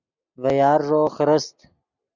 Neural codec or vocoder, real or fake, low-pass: none; real; 7.2 kHz